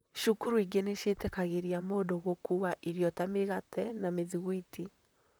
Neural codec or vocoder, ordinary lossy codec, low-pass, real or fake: vocoder, 44.1 kHz, 128 mel bands, Pupu-Vocoder; none; none; fake